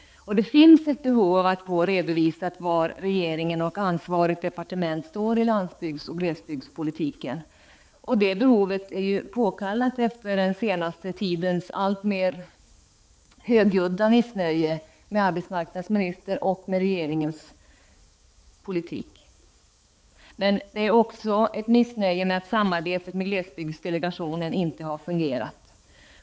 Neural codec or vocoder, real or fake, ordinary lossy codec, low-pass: codec, 16 kHz, 4 kbps, X-Codec, HuBERT features, trained on balanced general audio; fake; none; none